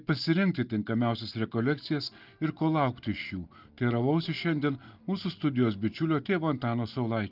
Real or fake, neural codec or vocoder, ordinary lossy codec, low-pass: real; none; Opus, 24 kbps; 5.4 kHz